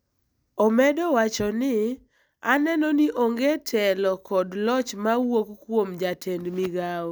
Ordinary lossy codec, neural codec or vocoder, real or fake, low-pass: none; none; real; none